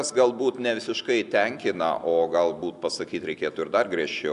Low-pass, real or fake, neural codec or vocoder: 10.8 kHz; real; none